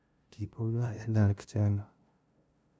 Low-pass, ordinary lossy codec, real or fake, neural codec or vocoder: none; none; fake; codec, 16 kHz, 0.5 kbps, FunCodec, trained on LibriTTS, 25 frames a second